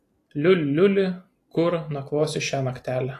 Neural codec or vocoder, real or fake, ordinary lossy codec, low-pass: none; real; AAC, 48 kbps; 14.4 kHz